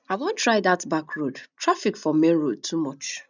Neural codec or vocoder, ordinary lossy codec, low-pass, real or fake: none; none; 7.2 kHz; real